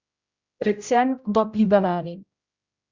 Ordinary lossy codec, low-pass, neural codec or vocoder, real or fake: Opus, 64 kbps; 7.2 kHz; codec, 16 kHz, 0.5 kbps, X-Codec, HuBERT features, trained on general audio; fake